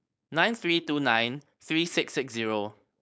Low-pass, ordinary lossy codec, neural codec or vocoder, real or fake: none; none; codec, 16 kHz, 4.8 kbps, FACodec; fake